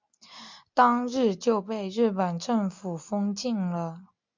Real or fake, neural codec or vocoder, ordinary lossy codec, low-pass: real; none; MP3, 64 kbps; 7.2 kHz